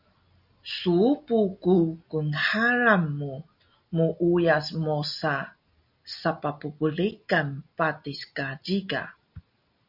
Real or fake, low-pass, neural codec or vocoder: real; 5.4 kHz; none